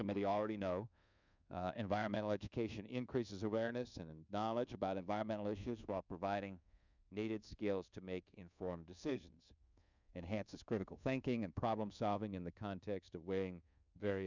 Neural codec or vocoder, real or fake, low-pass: codec, 24 kHz, 1.2 kbps, DualCodec; fake; 7.2 kHz